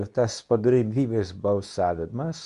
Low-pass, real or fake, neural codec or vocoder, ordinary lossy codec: 10.8 kHz; fake; codec, 24 kHz, 0.9 kbps, WavTokenizer, medium speech release version 2; Opus, 64 kbps